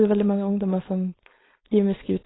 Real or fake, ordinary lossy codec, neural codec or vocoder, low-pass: fake; AAC, 16 kbps; codec, 16 kHz, 4.8 kbps, FACodec; 7.2 kHz